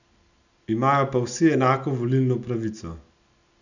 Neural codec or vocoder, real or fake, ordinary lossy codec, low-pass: none; real; none; 7.2 kHz